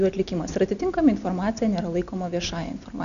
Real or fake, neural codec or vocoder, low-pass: real; none; 7.2 kHz